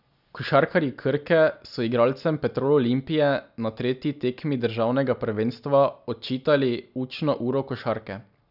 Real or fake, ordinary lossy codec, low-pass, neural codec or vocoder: real; none; 5.4 kHz; none